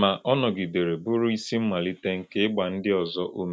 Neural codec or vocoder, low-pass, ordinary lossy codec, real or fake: none; none; none; real